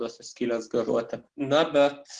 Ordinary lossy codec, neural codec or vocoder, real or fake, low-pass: Opus, 16 kbps; none; real; 10.8 kHz